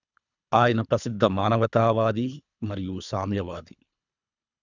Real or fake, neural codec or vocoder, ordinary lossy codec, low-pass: fake; codec, 24 kHz, 3 kbps, HILCodec; none; 7.2 kHz